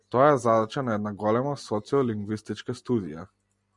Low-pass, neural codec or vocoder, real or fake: 10.8 kHz; none; real